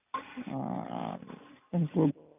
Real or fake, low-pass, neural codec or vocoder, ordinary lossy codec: real; 3.6 kHz; none; none